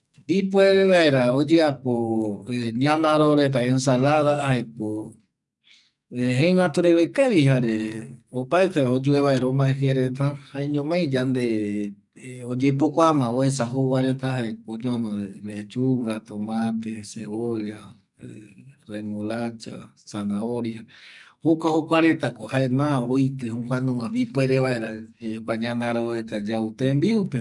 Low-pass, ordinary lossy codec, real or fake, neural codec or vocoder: 10.8 kHz; none; fake; codec, 44.1 kHz, 2.6 kbps, SNAC